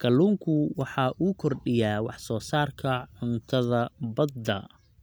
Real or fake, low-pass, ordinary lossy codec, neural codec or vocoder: real; none; none; none